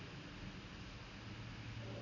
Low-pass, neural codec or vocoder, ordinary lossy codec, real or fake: 7.2 kHz; none; none; real